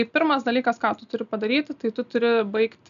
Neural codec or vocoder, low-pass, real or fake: none; 7.2 kHz; real